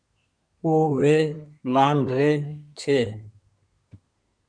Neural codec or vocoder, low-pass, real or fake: codec, 24 kHz, 1 kbps, SNAC; 9.9 kHz; fake